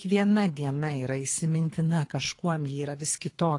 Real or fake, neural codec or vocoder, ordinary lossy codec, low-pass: fake; codec, 24 kHz, 3 kbps, HILCodec; AAC, 48 kbps; 10.8 kHz